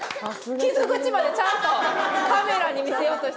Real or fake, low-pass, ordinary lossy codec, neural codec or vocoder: real; none; none; none